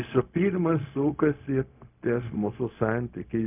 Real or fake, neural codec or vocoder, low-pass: fake; codec, 16 kHz, 0.4 kbps, LongCat-Audio-Codec; 3.6 kHz